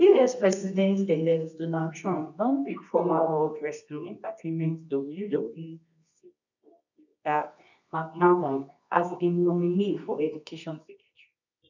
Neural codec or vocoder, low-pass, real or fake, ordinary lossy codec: codec, 24 kHz, 0.9 kbps, WavTokenizer, medium music audio release; 7.2 kHz; fake; none